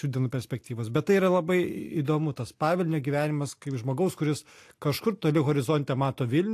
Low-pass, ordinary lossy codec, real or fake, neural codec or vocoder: 14.4 kHz; AAC, 64 kbps; real; none